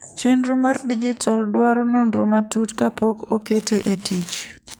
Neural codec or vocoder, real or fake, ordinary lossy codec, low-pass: codec, 44.1 kHz, 2.6 kbps, SNAC; fake; none; none